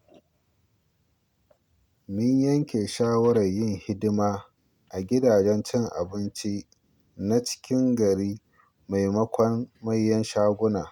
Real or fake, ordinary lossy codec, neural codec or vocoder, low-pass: real; none; none; 19.8 kHz